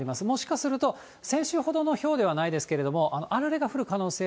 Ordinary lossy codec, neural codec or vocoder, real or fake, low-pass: none; none; real; none